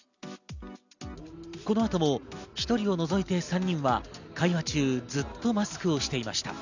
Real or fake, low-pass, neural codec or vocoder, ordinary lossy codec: real; 7.2 kHz; none; none